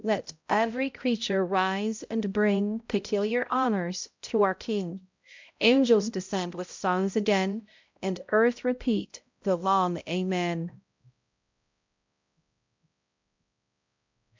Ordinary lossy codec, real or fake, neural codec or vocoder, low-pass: MP3, 64 kbps; fake; codec, 16 kHz, 0.5 kbps, X-Codec, HuBERT features, trained on balanced general audio; 7.2 kHz